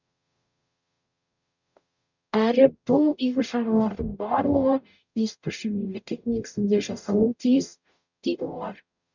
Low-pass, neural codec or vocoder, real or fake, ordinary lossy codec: 7.2 kHz; codec, 44.1 kHz, 0.9 kbps, DAC; fake; none